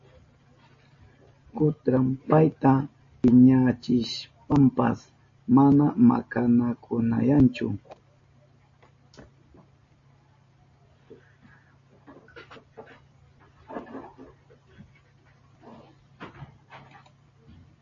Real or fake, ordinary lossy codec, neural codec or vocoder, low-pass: real; MP3, 32 kbps; none; 7.2 kHz